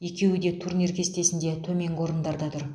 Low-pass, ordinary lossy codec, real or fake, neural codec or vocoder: none; none; real; none